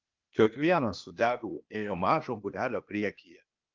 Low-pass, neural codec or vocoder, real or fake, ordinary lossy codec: 7.2 kHz; codec, 16 kHz, 0.8 kbps, ZipCodec; fake; Opus, 24 kbps